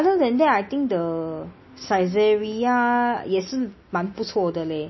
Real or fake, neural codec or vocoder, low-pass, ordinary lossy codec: real; none; 7.2 kHz; MP3, 24 kbps